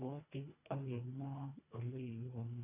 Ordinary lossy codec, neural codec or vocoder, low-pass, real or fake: none; codec, 24 kHz, 1.5 kbps, HILCodec; 3.6 kHz; fake